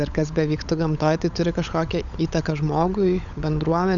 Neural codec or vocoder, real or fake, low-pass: codec, 16 kHz, 16 kbps, FunCodec, trained on LibriTTS, 50 frames a second; fake; 7.2 kHz